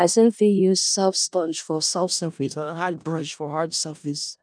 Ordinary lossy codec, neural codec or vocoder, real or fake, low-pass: none; codec, 16 kHz in and 24 kHz out, 0.4 kbps, LongCat-Audio-Codec, four codebook decoder; fake; 9.9 kHz